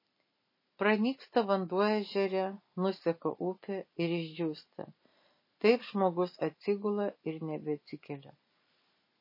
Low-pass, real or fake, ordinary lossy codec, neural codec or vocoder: 5.4 kHz; real; MP3, 24 kbps; none